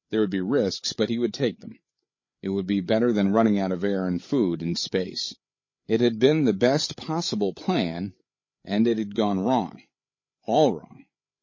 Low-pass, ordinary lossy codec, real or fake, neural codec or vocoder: 7.2 kHz; MP3, 32 kbps; fake; codec, 16 kHz, 8 kbps, FreqCodec, larger model